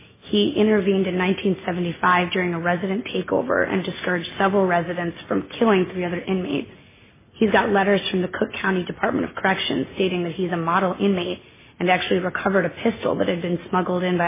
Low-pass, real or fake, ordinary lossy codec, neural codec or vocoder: 3.6 kHz; real; MP3, 16 kbps; none